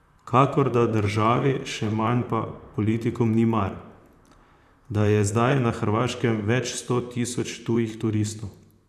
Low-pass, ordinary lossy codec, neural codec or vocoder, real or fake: 14.4 kHz; none; vocoder, 44.1 kHz, 128 mel bands, Pupu-Vocoder; fake